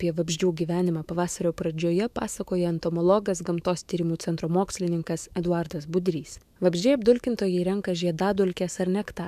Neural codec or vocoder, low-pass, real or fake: autoencoder, 48 kHz, 128 numbers a frame, DAC-VAE, trained on Japanese speech; 14.4 kHz; fake